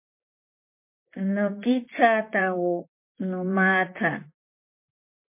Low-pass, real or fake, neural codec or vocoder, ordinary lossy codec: 3.6 kHz; fake; vocoder, 44.1 kHz, 128 mel bands, Pupu-Vocoder; MP3, 24 kbps